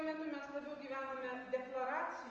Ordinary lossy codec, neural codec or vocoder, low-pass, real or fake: Opus, 24 kbps; none; 7.2 kHz; real